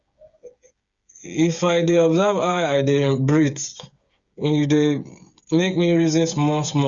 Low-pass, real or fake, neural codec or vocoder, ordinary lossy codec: 7.2 kHz; fake; codec, 16 kHz, 8 kbps, FreqCodec, smaller model; Opus, 64 kbps